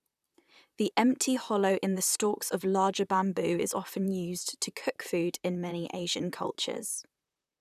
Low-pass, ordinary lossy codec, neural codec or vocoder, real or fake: 14.4 kHz; none; vocoder, 44.1 kHz, 128 mel bands, Pupu-Vocoder; fake